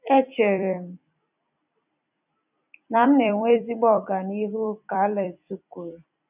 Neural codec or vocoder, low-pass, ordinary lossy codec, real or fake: vocoder, 44.1 kHz, 128 mel bands every 256 samples, BigVGAN v2; 3.6 kHz; none; fake